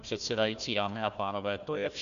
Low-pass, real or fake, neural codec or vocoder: 7.2 kHz; fake; codec, 16 kHz, 1 kbps, FunCodec, trained on Chinese and English, 50 frames a second